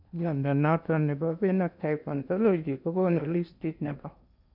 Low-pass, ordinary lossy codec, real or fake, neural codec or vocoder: 5.4 kHz; none; fake; codec, 16 kHz in and 24 kHz out, 0.8 kbps, FocalCodec, streaming, 65536 codes